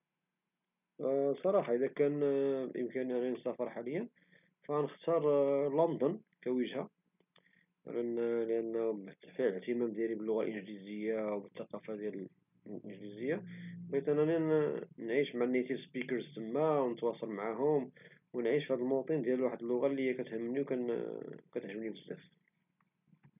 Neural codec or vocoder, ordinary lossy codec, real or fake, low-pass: none; none; real; 3.6 kHz